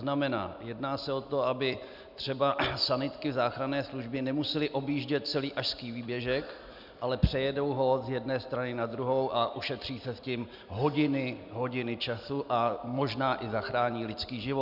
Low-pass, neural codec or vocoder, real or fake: 5.4 kHz; none; real